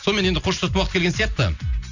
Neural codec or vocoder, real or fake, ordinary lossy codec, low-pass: none; real; none; 7.2 kHz